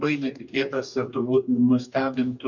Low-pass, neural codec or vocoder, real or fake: 7.2 kHz; codec, 44.1 kHz, 2.6 kbps, DAC; fake